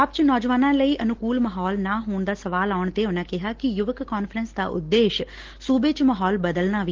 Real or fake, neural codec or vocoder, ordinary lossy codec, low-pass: real; none; Opus, 16 kbps; 7.2 kHz